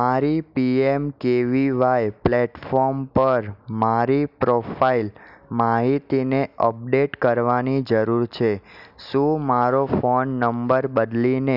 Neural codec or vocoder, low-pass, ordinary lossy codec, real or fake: none; 5.4 kHz; none; real